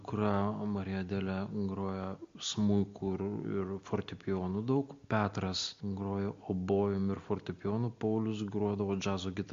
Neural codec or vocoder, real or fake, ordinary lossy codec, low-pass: none; real; MP3, 48 kbps; 7.2 kHz